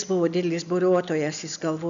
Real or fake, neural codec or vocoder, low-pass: real; none; 7.2 kHz